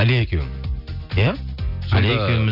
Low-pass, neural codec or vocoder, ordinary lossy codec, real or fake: 5.4 kHz; none; none; real